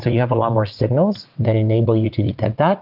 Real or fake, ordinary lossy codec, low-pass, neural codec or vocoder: fake; Opus, 24 kbps; 5.4 kHz; vocoder, 22.05 kHz, 80 mel bands, WaveNeXt